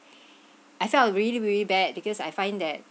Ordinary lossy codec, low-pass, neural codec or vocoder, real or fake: none; none; none; real